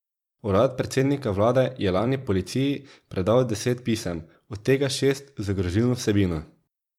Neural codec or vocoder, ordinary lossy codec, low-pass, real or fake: vocoder, 44.1 kHz, 128 mel bands every 256 samples, BigVGAN v2; MP3, 96 kbps; 19.8 kHz; fake